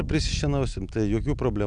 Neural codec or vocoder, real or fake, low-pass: none; real; 9.9 kHz